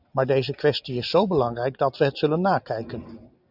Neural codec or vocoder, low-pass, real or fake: none; 5.4 kHz; real